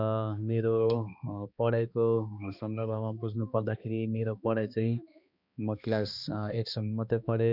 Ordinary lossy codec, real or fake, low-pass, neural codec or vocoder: none; fake; 5.4 kHz; codec, 16 kHz, 2 kbps, X-Codec, HuBERT features, trained on balanced general audio